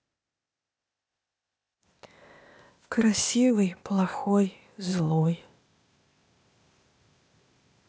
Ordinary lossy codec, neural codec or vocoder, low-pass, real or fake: none; codec, 16 kHz, 0.8 kbps, ZipCodec; none; fake